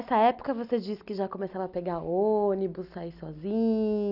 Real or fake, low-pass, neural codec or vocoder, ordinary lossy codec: real; 5.4 kHz; none; none